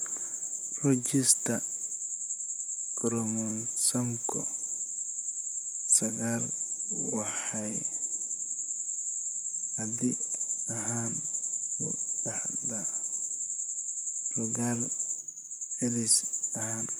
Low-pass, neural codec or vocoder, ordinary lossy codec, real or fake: none; vocoder, 44.1 kHz, 128 mel bands, Pupu-Vocoder; none; fake